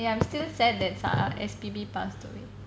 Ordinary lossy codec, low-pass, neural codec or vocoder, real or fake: none; none; none; real